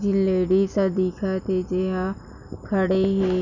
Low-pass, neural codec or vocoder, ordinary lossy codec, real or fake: 7.2 kHz; none; none; real